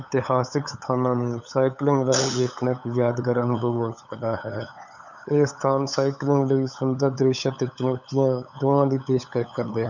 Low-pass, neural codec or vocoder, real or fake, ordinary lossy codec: 7.2 kHz; codec, 16 kHz, 8 kbps, FunCodec, trained on LibriTTS, 25 frames a second; fake; none